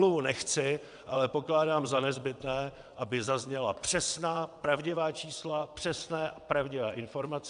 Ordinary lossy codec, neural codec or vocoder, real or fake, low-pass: AAC, 96 kbps; vocoder, 22.05 kHz, 80 mel bands, WaveNeXt; fake; 9.9 kHz